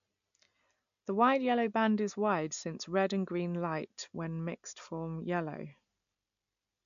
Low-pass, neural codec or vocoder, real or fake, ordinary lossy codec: 7.2 kHz; none; real; MP3, 96 kbps